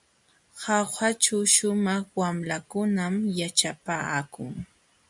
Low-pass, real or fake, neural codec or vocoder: 10.8 kHz; real; none